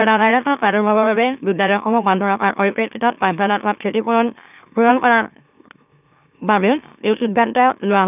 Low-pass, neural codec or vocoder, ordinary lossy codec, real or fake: 3.6 kHz; autoencoder, 44.1 kHz, a latent of 192 numbers a frame, MeloTTS; none; fake